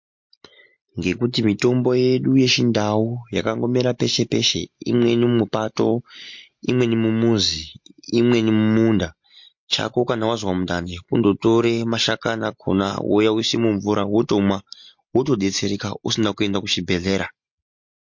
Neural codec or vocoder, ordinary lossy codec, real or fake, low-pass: none; MP3, 48 kbps; real; 7.2 kHz